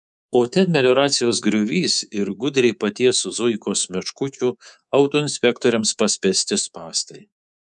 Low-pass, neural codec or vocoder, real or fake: 10.8 kHz; codec, 24 kHz, 3.1 kbps, DualCodec; fake